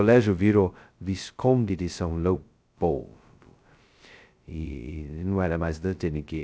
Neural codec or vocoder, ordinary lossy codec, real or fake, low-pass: codec, 16 kHz, 0.2 kbps, FocalCodec; none; fake; none